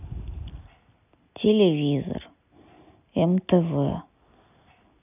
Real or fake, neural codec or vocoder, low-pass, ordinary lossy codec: real; none; 3.6 kHz; none